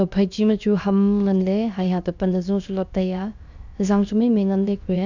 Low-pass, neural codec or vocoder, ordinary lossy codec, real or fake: 7.2 kHz; codec, 16 kHz, 0.7 kbps, FocalCodec; none; fake